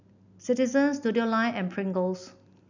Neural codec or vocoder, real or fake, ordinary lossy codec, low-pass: none; real; none; 7.2 kHz